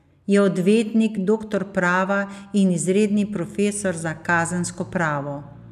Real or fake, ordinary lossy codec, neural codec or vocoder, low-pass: real; none; none; 14.4 kHz